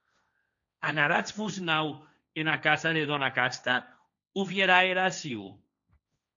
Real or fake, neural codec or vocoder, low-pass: fake; codec, 16 kHz, 1.1 kbps, Voila-Tokenizer; 7.2 kHz